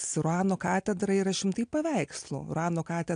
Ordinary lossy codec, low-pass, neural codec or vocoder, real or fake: AAC, 64 kbps; 9.9 kHz; none; real